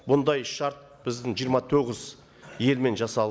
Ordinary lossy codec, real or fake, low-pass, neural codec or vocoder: none; real; none; none